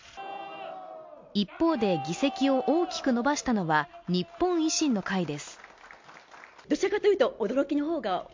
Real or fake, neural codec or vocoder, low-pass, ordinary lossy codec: real; none; 7.2 kHz; MP3, 48 kbps